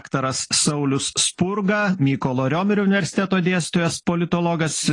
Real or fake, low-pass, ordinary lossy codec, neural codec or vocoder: real; 10.8 kHz; AAC, 32 kbps; none